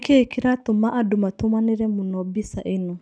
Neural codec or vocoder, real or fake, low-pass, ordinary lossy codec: none; real; 9.9 kHz; none